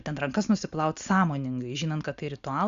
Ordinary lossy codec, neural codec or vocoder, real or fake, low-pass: Opus, 64 kbps; none; real; 7.2 kHz